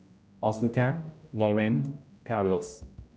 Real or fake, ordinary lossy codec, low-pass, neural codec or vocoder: fake; none; none; codec, 16 kHz, 0.5 kbps, X-Codec, HuBERT features, trained on general audio